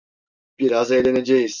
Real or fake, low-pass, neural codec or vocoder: real; 7.2 kHz; none